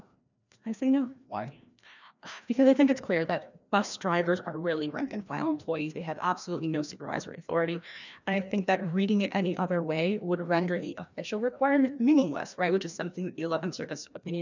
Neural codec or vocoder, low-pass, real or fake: codec, 16 kHz, 1 kbps, FreqCodec, larger model; 7.2 kHz; fake